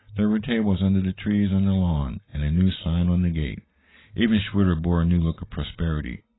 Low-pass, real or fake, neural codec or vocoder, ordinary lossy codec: 7.2 kHz; real; none; AAC, 16 kbps